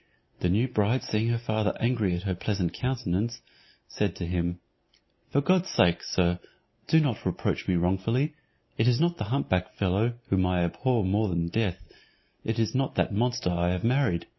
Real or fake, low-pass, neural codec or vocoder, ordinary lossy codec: real; 7.2 kHz; none; MP3, 24 kbps